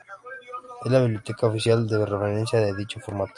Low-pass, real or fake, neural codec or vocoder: 10.8 kHz; real; none